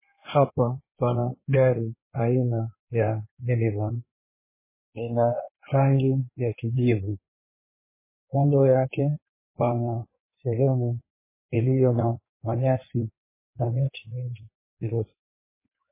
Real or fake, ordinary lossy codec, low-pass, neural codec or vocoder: fake; MP3, 16 kbps; 3.6 kHz; codec, 16 kHz in and 24 kHz out, 1.1 kbps, FireRedTTS-2 codec